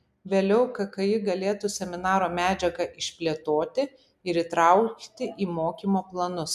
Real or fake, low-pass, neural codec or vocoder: real; 14.4 kHz; none